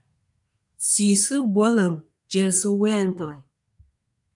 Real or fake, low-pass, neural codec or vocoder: fake; 10.8 kHz; codec, 24 kHz, 1 kbps, SNAC